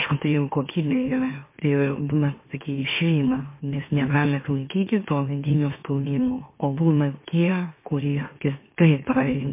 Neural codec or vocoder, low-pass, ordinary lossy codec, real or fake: autoencoder, 44.1 kHz, a latent of 192 numbers a frame, MeloTTS; 3.6 kHz; MP3, 24 kbps; fake